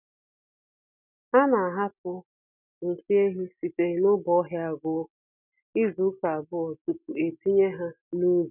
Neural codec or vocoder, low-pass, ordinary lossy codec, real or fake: none; 3.6 kHz; none; real